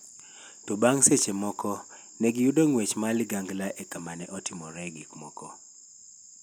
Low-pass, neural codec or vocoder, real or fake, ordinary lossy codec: none; none; real; none